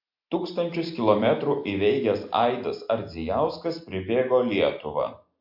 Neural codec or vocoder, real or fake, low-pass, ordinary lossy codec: none; real; 5.4 kHz; MP3, 48 kbps